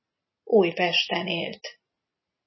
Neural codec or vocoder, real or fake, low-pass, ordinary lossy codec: none; real; 7.2 kHz; MP3, 24 kbps